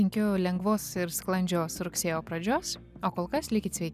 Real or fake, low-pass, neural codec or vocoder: real; 14.4 kHz; none